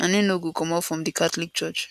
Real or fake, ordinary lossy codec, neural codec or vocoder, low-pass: real; none; none; 14.4 kHz